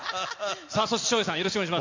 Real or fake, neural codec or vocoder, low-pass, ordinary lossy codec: real; none; 7.2 kHz; MP3, 64 kbps